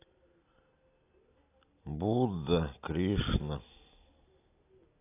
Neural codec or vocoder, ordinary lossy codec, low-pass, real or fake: none; AAC, 24 kbps; 3.6 kHz; real